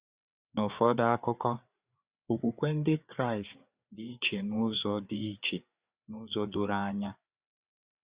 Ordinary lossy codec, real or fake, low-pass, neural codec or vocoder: Opus, 64 kbps; fake; 3.6 kHz; codec, 16 kHz, 4 kbps, FreqCodec, larger model